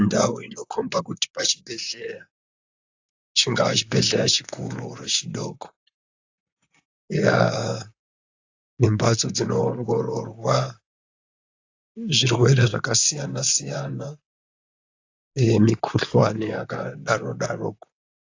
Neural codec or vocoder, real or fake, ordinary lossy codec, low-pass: vocoder, 44.1 kHz, 128 mel bands, Pupu-Vocoder; fake; AAC, 48 kbps; 7.2 kHz